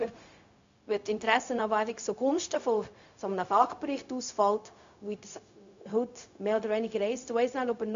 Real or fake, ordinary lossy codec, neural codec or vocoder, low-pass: fake; MP3, 64 kbps; codec, 16 kHz, 0.4 kbps, LongCat-Audio-Codec; 7.2 kHz